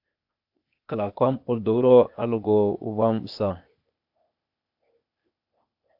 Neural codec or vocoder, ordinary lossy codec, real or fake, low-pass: codec, 16 kHz, 0.8 kbps, ZipCodec; AAC, 48 kbps; fake; 5.4 kHz